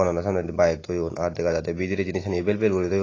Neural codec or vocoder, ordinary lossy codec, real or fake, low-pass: none; AAC, 32 kbps; real; 7.2 kHz